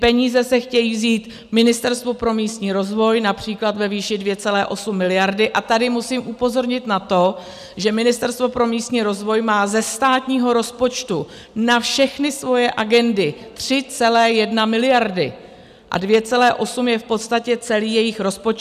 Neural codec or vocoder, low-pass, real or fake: none; 14.4 kHz; real